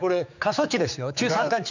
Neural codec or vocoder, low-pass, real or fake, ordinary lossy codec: codec, 16 kHz, 4 kbps, X-Codec, HuBERT features, trained on general audio; 7.2 kHz; fake; none